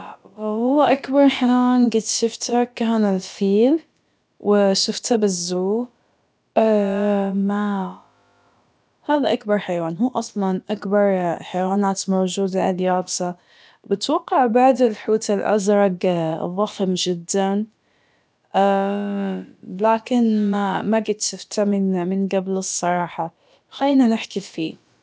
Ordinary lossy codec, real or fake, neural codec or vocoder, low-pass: none; fake; codec, 16 kHz, about 1 kbps, DyCAST, with the encoder's durations; none